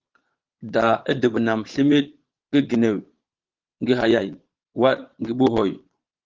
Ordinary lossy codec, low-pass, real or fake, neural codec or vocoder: Opus, 16 kbps; 7.2 kHz; fake; vocoder, 22.05 kHz, 80 mel bands, Vocos